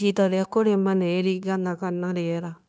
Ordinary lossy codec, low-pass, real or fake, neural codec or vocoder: none; none; fake; codec, 16 kHz, 0.9 kbps, LongCat-Audio-Codec